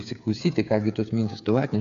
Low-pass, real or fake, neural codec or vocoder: 7.2 kHz; fake; codec, 16 kHz, 8 kbps, FreqCodec, smaller model